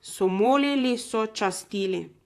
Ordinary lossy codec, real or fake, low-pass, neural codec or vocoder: AAC, 64 kbps; fake; 14.4 kHz; vocoder, 44.1 kHz, 128 mel bands, Pupu-Vocoder